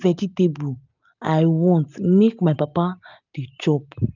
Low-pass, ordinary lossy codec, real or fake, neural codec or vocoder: 7.2 kHz; none; real; none